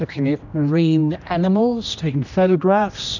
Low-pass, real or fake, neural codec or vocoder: 7.2 kHz; fake; codec, 16 kHz, 1 kbps, X-Codec, HuBERT features, trained on general audio